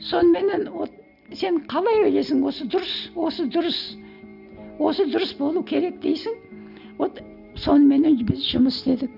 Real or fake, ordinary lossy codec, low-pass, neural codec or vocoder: real; none; 5.4 kHz; none